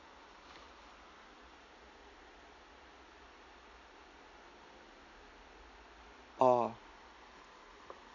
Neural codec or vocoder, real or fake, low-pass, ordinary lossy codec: none; real; 7.2 kHz; none